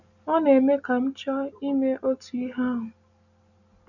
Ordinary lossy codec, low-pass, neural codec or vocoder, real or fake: none; 7.2 kHz; none; real